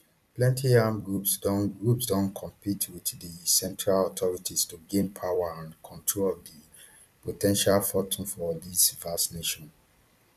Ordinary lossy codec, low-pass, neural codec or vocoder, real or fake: none; 14.4 kHz; vocoder, 44.1 kHz, 128 mel bands every 256 samples, BigVGAN v2; fake